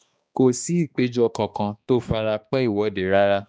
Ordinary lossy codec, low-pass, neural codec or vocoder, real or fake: none; none; codec, 16 kHz, 2 kbps, X-Codec, HuBERT features, trained on balanced general audio; fake